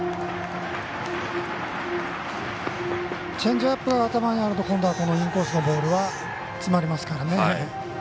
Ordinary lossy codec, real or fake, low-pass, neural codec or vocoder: none; real; none; none